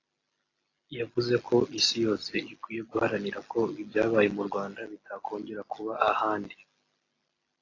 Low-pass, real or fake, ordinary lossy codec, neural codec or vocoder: 7.2 kHz; real; AAC, 32 kbps; none